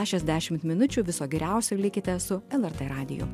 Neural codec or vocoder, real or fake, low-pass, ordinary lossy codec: none; real; 14.4 kHz; MP3, 96 kbps